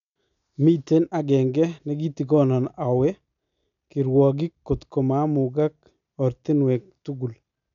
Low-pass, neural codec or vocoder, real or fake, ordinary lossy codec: 7.2 kHz; none; real; none